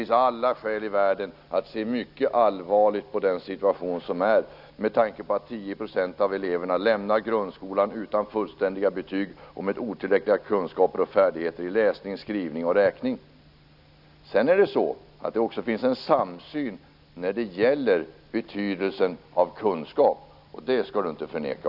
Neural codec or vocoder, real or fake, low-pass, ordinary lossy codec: none; real; 5.4 kHz; none